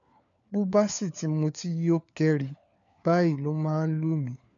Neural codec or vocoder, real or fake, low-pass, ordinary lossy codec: codec, 16 kHz, 4 kbps, FunCodec, trained on LibriTTS, 50 frames a second; fake; 7.2 kHz; none